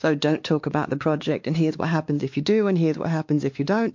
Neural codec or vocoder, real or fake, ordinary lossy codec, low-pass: codec, 16 kHz, 2 kbps, X-Codec, WavLM features, trained on Multilingual LibriSpeech; fake; MP3, 48 kbps; 7.2 kHz